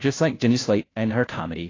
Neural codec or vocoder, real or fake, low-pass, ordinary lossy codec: codec, 16 kHz, 0.5 kbps, FunCodec, trained on Chinese and English, 25 frames a second; fake; 7.2 kHz; AAC, 32 kbps